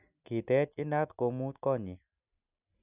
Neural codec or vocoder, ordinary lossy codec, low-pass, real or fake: none; none; 3.6 kHz; real